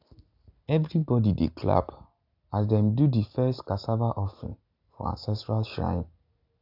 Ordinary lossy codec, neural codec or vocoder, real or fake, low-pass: AAC, 48 kbps; none; real; 5.4 kHz